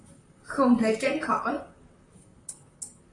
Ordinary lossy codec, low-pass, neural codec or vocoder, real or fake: AAC, 32 kbps; 10.8 kHz; vocoder, 44.1 kHz, 128 mel bands, Pupu-Vocoder; fake